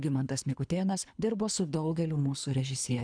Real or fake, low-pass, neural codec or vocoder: fake; 9.9 kHz; codec, 24 kHz, 3 kbps, HILCodec